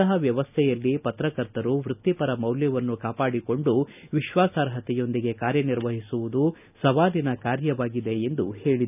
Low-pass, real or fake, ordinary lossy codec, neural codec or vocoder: 3.6 kHz; real; none; none